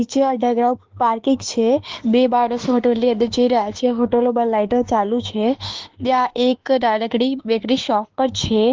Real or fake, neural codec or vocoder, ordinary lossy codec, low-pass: fake; autoencoder, 48 kHz, 32 numbers a frame, DAC-VAE, trained on Japanese speech; Opus, 16 kbps; 7.2 kHz